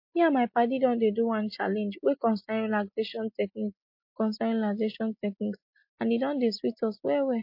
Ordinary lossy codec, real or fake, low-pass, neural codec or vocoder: MP3, 32 kbps; real; 5.4 kHz; none